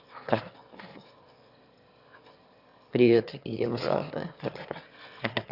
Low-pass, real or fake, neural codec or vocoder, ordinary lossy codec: 5.4 kHz; fake; autoencoder, 22.05 kHz, a latent of 192 numbers a frame, VITS, trained on one speaker; Opus, 64 kbps